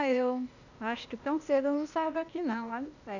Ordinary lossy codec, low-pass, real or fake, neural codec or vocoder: none; 7.2 kHz; fake; codec, 16 kHz, 0.8 kbps, ZipCodec